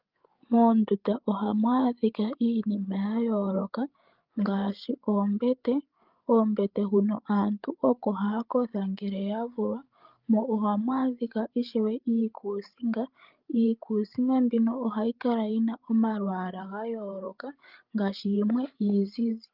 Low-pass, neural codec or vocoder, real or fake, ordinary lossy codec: 5.4 kHz; codec, 16 kHz, 8 kbps, FreqCodec, larger model; fake; Opus, 32 kbps